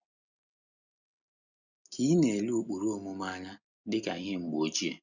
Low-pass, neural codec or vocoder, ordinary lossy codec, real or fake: 7.2 kHz; none; none; real